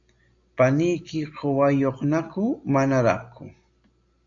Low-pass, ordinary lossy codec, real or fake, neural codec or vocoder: 7.2 kHz; AAC, 64 kbps; real; none